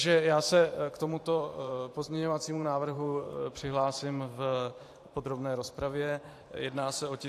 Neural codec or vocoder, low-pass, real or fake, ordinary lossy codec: vocoder, 44.1 kHz, 128 mel bands every 512 samples, BigVGAN v2; 14.4 kHz; fake; AAC, 64 kbps